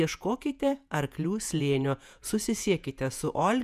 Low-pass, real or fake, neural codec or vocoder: 14.4 kHz; fake; vocoder, 48 kHz, 128 mel bands, Vocos